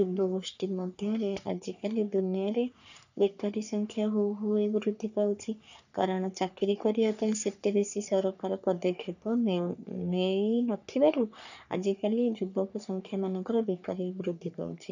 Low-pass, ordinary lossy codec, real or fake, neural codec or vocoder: 7.2 kHz; none; fake; codec, 44.1 kHz, 3.4 kbps, Pupu-Codec